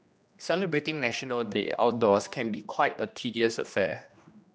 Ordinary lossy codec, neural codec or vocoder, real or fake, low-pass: none; codec, 16 kHz, 1 kbps, X-Codec, HuBERT features, trained on general audio; fake; none